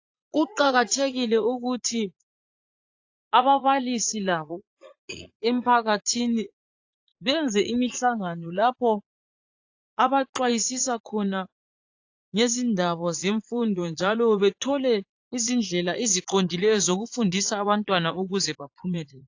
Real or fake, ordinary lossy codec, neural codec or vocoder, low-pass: fake; AAC, 48 kbps; vocoder, 44.1 kHz, 80 mel bands, Vocos; 7.2 kHz